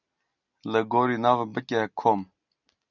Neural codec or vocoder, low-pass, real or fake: none; 7.2 kHz; real